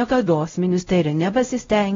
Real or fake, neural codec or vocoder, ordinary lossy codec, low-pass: fake; codec, 16 kHz, 0.5 kbps, X-Codec, WavLM features, trained on Multilingual LibriSpeech; AAC, 24 kbps; 7.2 kHz